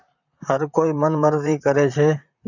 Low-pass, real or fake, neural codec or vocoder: 7.2 kHz; fake; vocoder, 44.1 kHz, 128 mel bands, Pupu-Vocoder